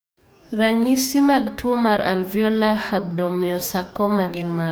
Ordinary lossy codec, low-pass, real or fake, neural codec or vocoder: none; none; fake; codec, 44.1 kHz, 2.6 kbps, DAC